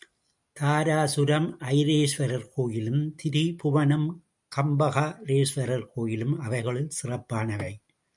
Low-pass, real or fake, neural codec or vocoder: 10.8 kHz; real; none